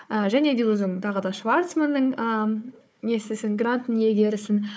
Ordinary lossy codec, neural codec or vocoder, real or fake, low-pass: none; codec, 16 kHz, 4 kbps, FunCodec, trained on Chinese and English, 50 frames a second; fake; none